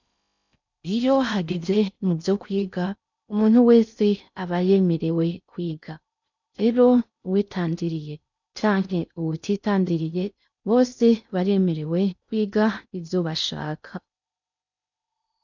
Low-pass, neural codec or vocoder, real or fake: 7.2 kHz; codec, 16 kHz in and 24 kHz out, 0.6 kbps, FocalCodec, streaming, 4096 codes; fake